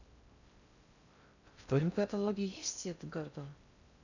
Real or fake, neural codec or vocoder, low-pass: fake; codec, 16 kHz in and 24 kHz out, 0.6 kbps, FocalCodec, streaming, 4096 codes; 7.2 kHz